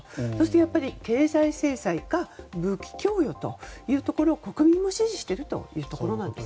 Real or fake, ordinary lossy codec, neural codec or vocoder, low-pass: real; none; none; none